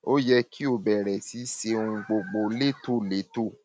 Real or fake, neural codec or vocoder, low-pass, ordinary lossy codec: real; none; none; none